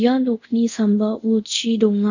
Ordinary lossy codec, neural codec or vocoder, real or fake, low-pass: none; codec, 24 kHz, 0.5 kbps, DualCodec; fake; 7.2 kHz